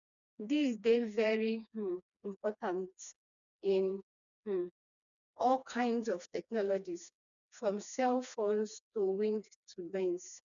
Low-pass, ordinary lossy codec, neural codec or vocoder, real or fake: 7.2 kHz; none; codec, 16 kHz, 2 kbps, FreqCodec, smaller model; fake